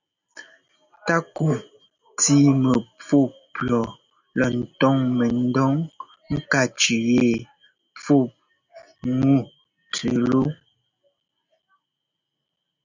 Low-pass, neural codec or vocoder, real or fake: 7.2 kHz; none; real